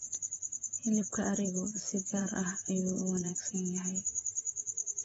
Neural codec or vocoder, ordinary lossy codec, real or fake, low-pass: vocoder, 44.1 kHz, 128 mel bands, Pupu-Vocoder; AAC, 24 kbps; fake; 19.8 kHz